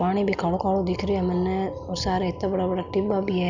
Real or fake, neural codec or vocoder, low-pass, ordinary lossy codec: real; none; 7.2 kHz; none